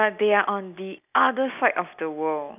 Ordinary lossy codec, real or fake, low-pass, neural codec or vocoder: none; real; 3.6 kHz; none